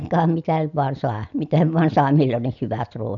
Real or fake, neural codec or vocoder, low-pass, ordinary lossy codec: real; none; 7.2 kHz; none